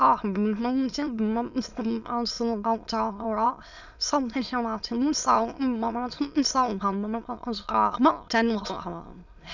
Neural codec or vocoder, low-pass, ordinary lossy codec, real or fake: autoencoder, 22.05 kHz, a latent of 192 numbers a frame, VITS, trained on many speakers; 7.2 kHz; none; fake